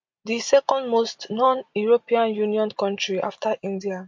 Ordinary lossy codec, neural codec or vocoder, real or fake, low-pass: MP3, 48 kbps; none; real; 7.2 kHz